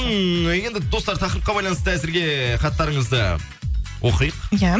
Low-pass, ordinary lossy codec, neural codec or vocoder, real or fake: none; none; none; real